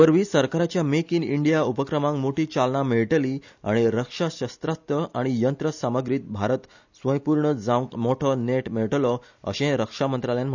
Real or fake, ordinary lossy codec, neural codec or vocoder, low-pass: real; none; none; 7.2 kHz